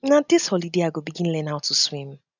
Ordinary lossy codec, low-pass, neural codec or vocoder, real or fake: none; 7.2 kHz; none; real